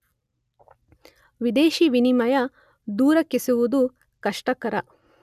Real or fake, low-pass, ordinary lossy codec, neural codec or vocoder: real; 14.4 kHz; none; none